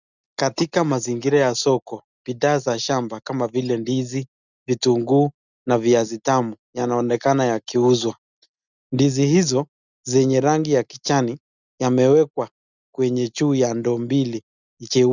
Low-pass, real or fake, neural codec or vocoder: 7.2 kHz; real; none